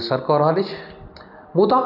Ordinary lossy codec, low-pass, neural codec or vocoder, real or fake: none; 5.4 kHz; none; real